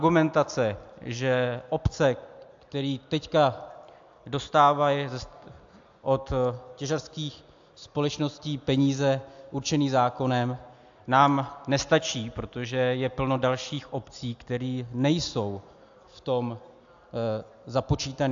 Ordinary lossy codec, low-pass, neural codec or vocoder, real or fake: AAC, 64 kbps; 7.2 kHz; none; real